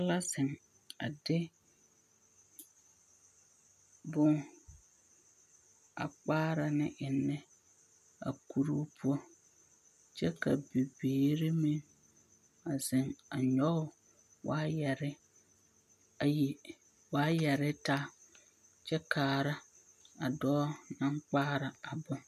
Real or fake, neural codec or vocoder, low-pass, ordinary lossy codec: real; none; 14.4 kHz; MP3, 96 kbps